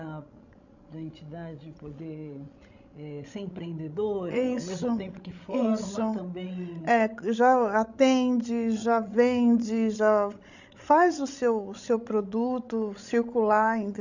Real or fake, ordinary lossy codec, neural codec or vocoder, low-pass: fake; none; codec, 16 kHz, 16 kbps, FreqCodec, larger model; 7.2 kHz